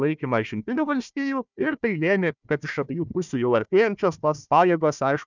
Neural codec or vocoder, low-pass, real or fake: codec, 16 kHz, 1 kbps, FunCodec, trained on Chinese and English, 50 frames a second; 7.2 kHz; fake